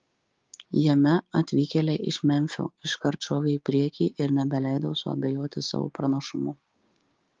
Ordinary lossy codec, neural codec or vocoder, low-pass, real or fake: Opus, 32 kbps; codec, 16 kHz, 6 kbps, DAC; 7.2 kHz; fake